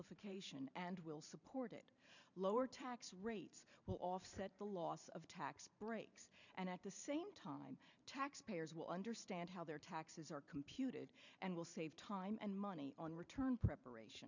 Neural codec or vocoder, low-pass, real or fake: vocoder, 44.1 kHz, 128 mel bands every 512 samples, BigVGAN v2; 7.2 kHz; fake